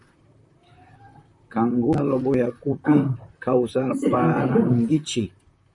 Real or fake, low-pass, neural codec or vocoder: fake; 10.8 kHz; vocoder, 44.1 kHz, 128 mel bands, Pupu-Vocoder